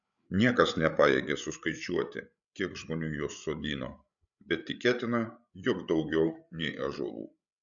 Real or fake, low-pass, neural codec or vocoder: fake; 7.2 kHz; codec, 16 kHz, 8 kbps, FreqCodec, larger model